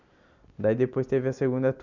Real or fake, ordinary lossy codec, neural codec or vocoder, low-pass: real; none; none; 7.2 kHz